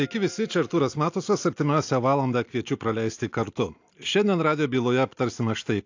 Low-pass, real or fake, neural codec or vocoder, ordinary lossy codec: 7.2 kHz; real; none; AAC, 48 kbps